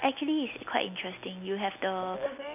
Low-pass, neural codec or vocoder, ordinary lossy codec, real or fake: 3.6 kHz; none; none; real